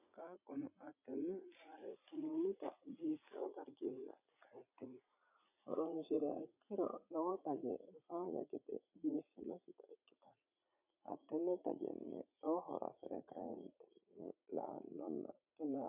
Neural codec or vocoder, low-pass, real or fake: vocoder, 44.1 kHz, 80 mel bands, Vocos; 3.6 kHz; fake